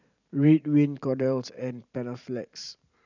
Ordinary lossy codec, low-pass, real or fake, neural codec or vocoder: none; 7.2 kHz; fake; vocoder, 44.1 kHz, 128 mel bands every 512 samples, BigVGAN v2